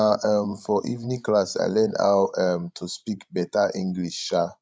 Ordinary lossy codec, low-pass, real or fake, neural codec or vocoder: none; none; fake; codec, 16 kHz, 16 kbps, FreqCodec, larger model